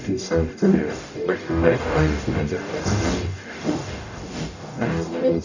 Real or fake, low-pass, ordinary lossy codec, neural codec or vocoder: fake; 7.2 kHz; none; codec, 44.1 kHz, 0.9 kbps, DAC